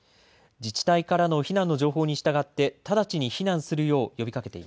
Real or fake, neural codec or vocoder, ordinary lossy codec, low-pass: real; none; none; none